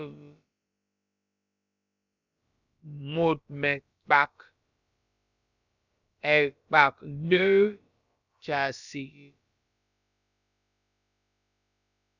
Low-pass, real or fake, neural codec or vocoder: 7.2 kHz; fake; codec, 16 kHz, about 1 kbps, DyCAST, with the encoder's durations